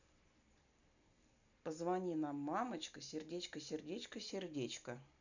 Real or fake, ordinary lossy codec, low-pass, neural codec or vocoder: real; none; 7.2 kHz; none